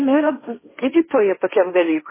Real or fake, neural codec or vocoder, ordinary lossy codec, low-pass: fake; codec, 16 kHz, 1.1 kbps, Voila-Tokenizer; MP3, 16 kbps; 3.6 kHz